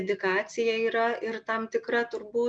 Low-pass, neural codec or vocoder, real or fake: 9.9 kHz; none; real